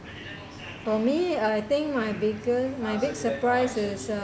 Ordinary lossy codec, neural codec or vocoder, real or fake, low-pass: none; none; real; none